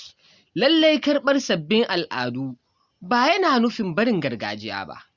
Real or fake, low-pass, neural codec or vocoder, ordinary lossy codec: real; 7.2 kHz; none; Opus, 64 kbps